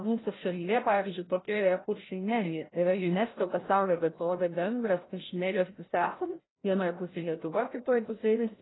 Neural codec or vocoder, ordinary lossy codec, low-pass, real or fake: codec, 16 kHz, 0.5 kbps, FreqCodec, larger model; AAC, 16 kbps; 7.2 kHz; fake